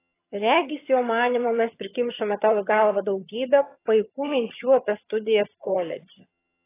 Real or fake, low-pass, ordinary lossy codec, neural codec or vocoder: fake; 3.6 kHz; AAC, 16 kbps; vocoder, 22.05 kHz, 80 mel bands, HiFi-GAN